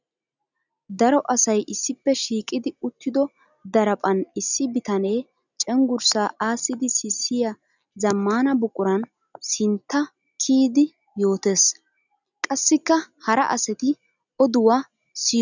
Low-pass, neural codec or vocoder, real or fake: 7.2 kHz; none; real